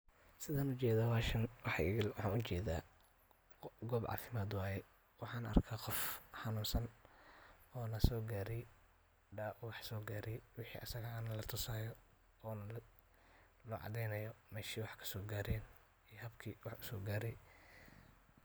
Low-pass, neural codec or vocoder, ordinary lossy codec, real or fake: none; none; none; real